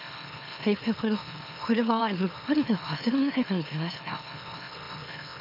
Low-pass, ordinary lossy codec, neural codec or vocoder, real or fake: 5.4 kHz; none; autoencoder, 44.1 kHz, a latent of 192 numbers a frame, MeloTTS; fake